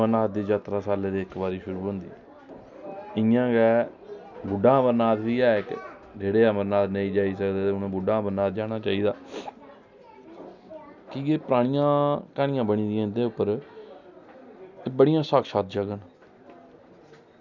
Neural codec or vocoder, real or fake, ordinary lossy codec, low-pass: none; real; none; 7.2 kHz